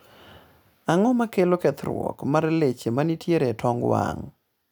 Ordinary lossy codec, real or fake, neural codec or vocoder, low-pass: none; real; none; none